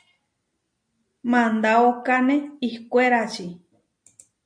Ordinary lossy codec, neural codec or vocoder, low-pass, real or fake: MP3, 48 kbps; none; 9.9 kHz; real